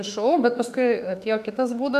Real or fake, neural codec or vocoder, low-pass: fake; autoencoder, 48 kHz, 32 numbers a frame, DAC-VAE, trained on Japanese speech; 14.4 kHz